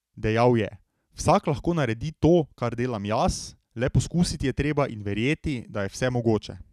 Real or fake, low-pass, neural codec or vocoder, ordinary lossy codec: real; 14.4 kHz; none; none